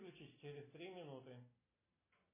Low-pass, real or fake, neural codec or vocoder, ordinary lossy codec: 3.6 kHz; real; none; MP3, 16 kbps